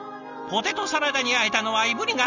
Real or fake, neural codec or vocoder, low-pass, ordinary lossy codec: real; none; 7.2 kHz; none